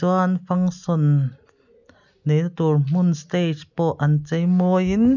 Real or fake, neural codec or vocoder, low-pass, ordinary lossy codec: real; none; 7.2 kHz; none